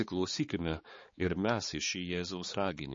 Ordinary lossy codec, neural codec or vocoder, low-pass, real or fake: MP3, 32 kbps; codec, 16 kHz, 4 kbps, X-Codec, HuBERT features, trained on balanced general audio; 7.2 kHz; fake